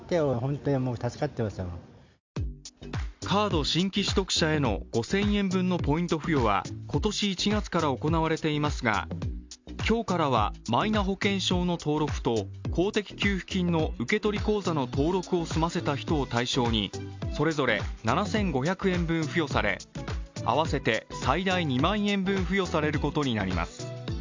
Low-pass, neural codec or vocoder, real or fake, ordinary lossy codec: 7.2 kHz; none; real; MP3, 64 kbps